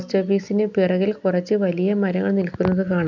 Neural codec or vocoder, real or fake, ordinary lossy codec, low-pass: none; real; none; 7.2 kHz